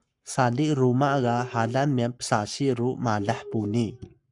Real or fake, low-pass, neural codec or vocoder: fake; 10.8 kHz; codec, 44.1 kHz, 7.8 kbps, Pupu-Codec